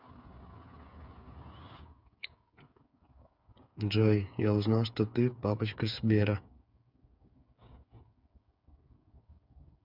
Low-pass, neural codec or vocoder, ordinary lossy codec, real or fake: 5.4 kHz; codec, 16 kHz, 8 kbps, FreqCodec, smaller model; none; fake